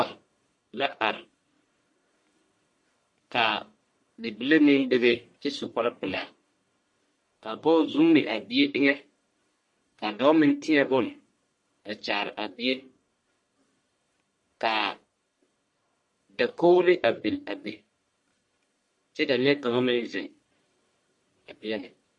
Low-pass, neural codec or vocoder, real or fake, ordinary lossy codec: 10.8 kHz; codec, 44.1 kHz, 1.7 kbps, Pupu-Codec; fake; MP3, 48 kbps